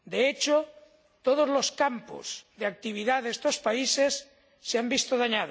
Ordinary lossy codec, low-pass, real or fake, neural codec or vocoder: none; none; real; none